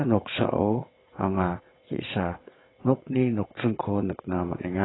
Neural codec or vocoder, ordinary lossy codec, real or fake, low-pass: vocoder, 22.05 kHz, 80 mel bands, WaveNeXt; AAC, 16 kbps; fake; 7.2 kHz